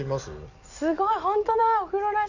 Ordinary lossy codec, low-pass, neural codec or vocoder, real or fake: none; 7.2 kHz; none; real